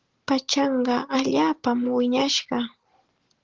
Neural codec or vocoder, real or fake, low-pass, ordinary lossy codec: none; real; 7.2 kHz; Opus, 16 kbps